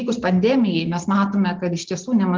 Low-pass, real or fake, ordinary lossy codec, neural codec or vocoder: 7.2 kHz; real; Opus, 16 kbps; none